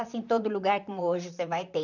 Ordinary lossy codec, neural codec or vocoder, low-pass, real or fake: none; vocoder, 44.1 kHz, 128 mel bands, Pupu-Vocoder; 7.2 kHz; fake